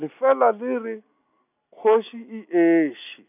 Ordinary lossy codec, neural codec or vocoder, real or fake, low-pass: none; none; real; 3.6 kHz